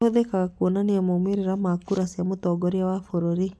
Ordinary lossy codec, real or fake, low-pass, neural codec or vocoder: none; real; none; none